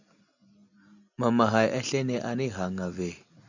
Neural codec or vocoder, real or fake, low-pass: none; real; 7.2 kHz